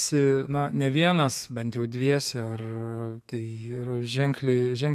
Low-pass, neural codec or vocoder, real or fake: 14.4 kHz; codec, 32 kHz, 1.9 kbps, SNAC; fake